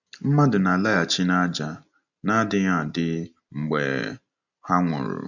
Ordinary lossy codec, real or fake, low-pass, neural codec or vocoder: none; real; 7.2 kHz; none